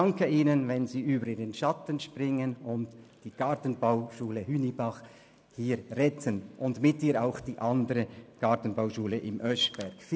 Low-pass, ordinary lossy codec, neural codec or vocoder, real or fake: none; none; none; real